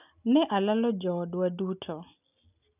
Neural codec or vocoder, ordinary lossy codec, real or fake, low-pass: none; none; real; 3.6 kHz